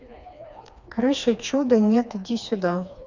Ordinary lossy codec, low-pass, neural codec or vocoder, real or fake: none; 7.2 kHz; codec, 16 kHz, 2 kbps, FreqCodec, smaller model; fake